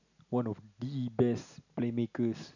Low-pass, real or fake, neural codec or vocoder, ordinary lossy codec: 7.2 kHz; real; none; none